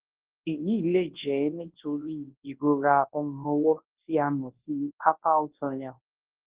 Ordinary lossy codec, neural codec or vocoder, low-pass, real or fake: Opus, 16 kbps; codec, 24 kHz, 0.9 kbps, WavTokenizer, large speech release; 3.6 kHz; fake